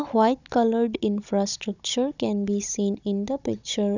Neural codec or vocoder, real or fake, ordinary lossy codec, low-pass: codec, 16 kHz, 16 kbps, FunCodec, trained on Chinese and English, 50 frames a second; fake; none; 7.2 kHz